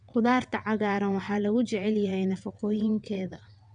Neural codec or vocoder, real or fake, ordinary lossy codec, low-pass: vocoder, 22.05 kHz, 80 mel bands, WaveNeXt; fake; none; 9.9 kHz